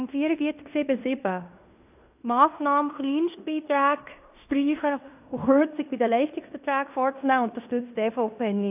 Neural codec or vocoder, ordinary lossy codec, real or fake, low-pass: codec, 16 kHz in and 24 kHz out, 0.9 kbps, LongCat-Audio-Codec, fine tuned four codebook decoder; AAC, 32 kbps; fake; 3.6 kHz